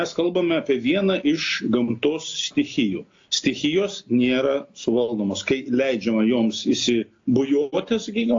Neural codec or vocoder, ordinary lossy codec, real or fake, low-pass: none; AAC, 48 kbps; real; 7.2 kHz